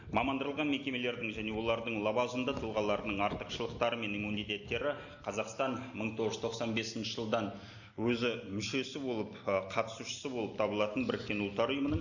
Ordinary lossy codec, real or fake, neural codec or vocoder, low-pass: none; real; none; 7.2 kHz